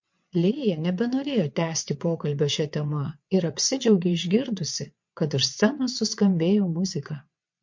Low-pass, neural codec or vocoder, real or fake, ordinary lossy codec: 7.2 kHz; vocoder, 22.05 kHz, 80 mel bands, WaveNeXt; fake; MP3, 48 kbps